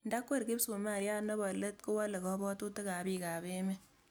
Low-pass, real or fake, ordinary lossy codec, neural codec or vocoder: none; real; none; none